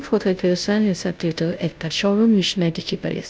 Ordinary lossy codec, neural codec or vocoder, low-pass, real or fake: none; codec, 16 kHz, 0.5 kbps, FunCodec, trained on Chinese and English, 25 frames a second; none; fake